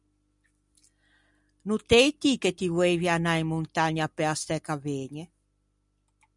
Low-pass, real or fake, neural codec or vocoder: 10.8 kHz; real; none